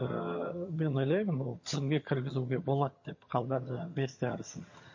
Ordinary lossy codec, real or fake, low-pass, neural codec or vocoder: MP3, 32 kbps; fake; 7.2 kHz; vocoder, 22.05 kHz, 80 mel bands, HiFi-GAN